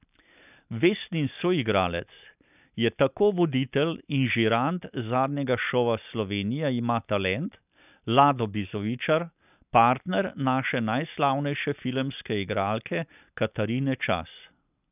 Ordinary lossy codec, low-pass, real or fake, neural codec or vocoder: none; 3.6 kHz; real; none